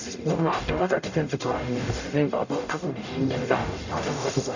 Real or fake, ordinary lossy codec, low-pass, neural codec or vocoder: fake; none; 7.2 kHz; codec, 44.1 kHz, 0.9 kbps, DAC